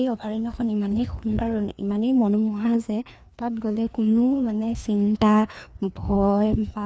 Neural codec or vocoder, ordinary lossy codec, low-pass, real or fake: codec, 16 kHz, 2 kbps, FreqCodec, larger model; none; none; fake